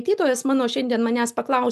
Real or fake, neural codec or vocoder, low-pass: real; none; 14.4 kHz